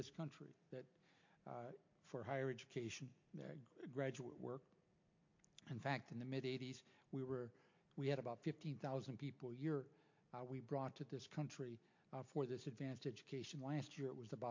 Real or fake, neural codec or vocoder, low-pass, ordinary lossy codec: real; none; 7.2 kHz; MP3, 48 kbps